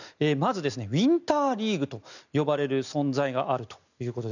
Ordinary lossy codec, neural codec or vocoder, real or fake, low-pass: none; none; real; 7.2 kHz